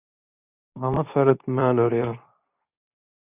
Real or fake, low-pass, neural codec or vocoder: fake; 3.6 kHz; codec, 24 kHz, 0.9 kbps, WavTokenizer, medium speech release version 2